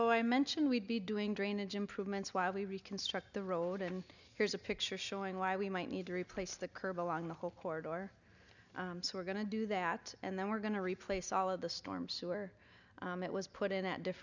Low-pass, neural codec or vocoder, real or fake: 7.2 kHz; none; real